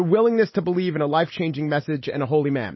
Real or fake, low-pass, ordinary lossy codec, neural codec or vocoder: real; 7.2 kHz; MP3, 24 kbps; none